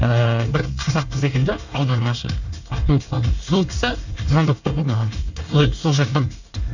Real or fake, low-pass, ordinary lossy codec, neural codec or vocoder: fake; 7.2 kHz; none; codec, 24 kHz, 1 kbps, SNAC